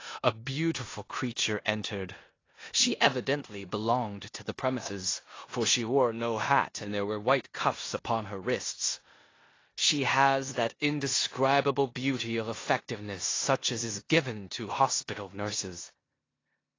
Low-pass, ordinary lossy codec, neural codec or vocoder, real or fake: 7.2 kHz; AAC, 32 kbps; codec, 16 kHz in and 24 kHz out, 0.9 kbps, LongCat-Audio-Codec, four codebook decoder; fake